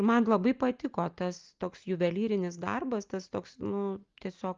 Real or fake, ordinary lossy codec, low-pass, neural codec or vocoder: real; Opus, 32 kbps; 7.2 kHz; none